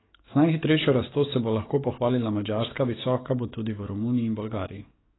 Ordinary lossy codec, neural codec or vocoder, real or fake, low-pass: AAC, 16 kbps; codec, 44.1 kHz, 7.8 kbps, DAC; fake; 7.2 kHz